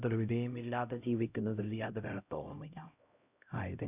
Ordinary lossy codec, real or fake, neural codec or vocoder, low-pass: none; fake; codec, 16 kHz, 0.5 kbps, X-Codec, HuBERT features, trained on LibriSpeech; 3.6 kHz